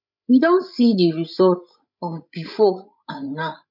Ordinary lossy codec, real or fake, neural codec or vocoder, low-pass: none; fake; codec, 16 kHz, 16 kbps, FreqCodec, larger model; 5.4 kHz